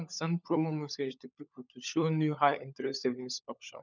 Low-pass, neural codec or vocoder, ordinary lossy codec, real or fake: 7.2 kHz; codec, 16 kHz, 16 kbps, FunCodec, trained on LibriTTS, 50 frames a second; none; fake